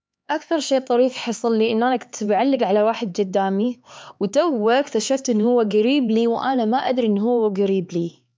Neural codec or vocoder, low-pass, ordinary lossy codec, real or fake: codec, 16 kHz, 4 kbps, X-Codec, HuBERT features, trained on LibriSpeech; none; none; fake